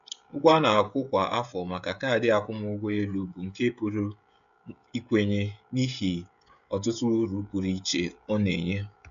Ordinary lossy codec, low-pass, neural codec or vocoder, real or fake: AAC, 96 kbps; 7.2 kHz; codec, 16 kHz, 16 kbps, FreqCodec, smaller model; fake